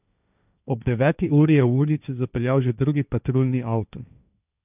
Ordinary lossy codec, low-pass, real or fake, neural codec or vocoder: none; 3.6 kHz; fake; codec, 16 kHz, 1.1 kbps, Voila-Tokenizer